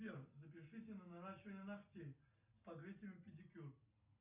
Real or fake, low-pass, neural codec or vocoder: real; 3.6 kHz; none